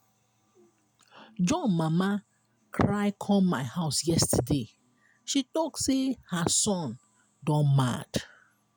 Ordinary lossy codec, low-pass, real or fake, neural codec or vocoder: none; none; real; none